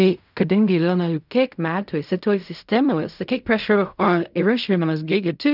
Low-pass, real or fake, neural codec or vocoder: 5.4 kHz; fake; codec, 16 kHz in and 24 kHz out, 0.4 kbps, LongCat-Audio-Codec, fine tuned four codebook decoder